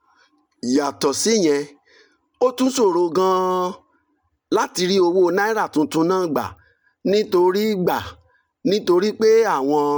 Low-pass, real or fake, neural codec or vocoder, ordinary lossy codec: none; real; none; none